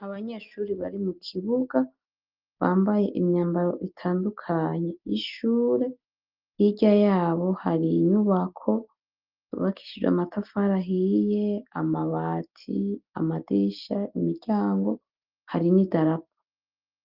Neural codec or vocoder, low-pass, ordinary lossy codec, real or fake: none; 5.4 kHz; Opus, 32 kbps; real